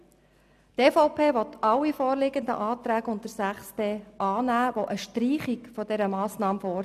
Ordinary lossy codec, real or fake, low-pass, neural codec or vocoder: none; real; 14.4 kHz; none